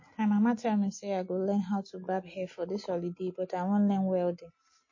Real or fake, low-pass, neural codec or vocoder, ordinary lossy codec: real; 7.2 kHz; none; MP3, 32 kbps